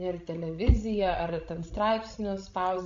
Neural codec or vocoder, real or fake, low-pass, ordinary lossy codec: codec, 16 kHz, 16 kbps, FreqCodec, larger model; fake; 7.2 kHz; AAC, 48 kbps